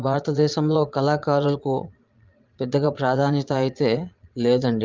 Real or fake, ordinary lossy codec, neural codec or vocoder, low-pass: fake; Opus, 24 kbps; vocoder, 22.05 kHz, 80 mel bands, Vocos; 7.2 kHz